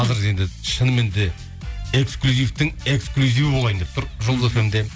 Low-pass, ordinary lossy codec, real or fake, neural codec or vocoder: none; none; real; none